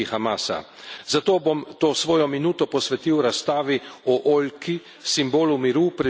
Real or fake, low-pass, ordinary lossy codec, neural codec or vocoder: real; none; none; none